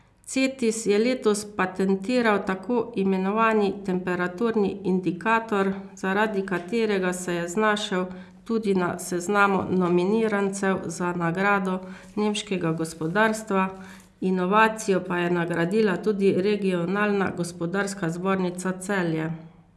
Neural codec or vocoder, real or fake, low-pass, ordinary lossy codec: none; real; none; none